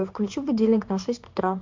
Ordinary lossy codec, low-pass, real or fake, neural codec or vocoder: MP3, 64 kbps; 7.2 kHz; fake; codec, 16 kHz in and 24 kHz out, 1 kbps, XY-Tokenizer